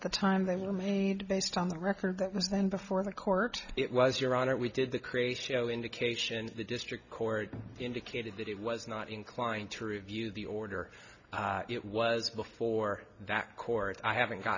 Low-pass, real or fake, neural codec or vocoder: 7.2 kHz; real; none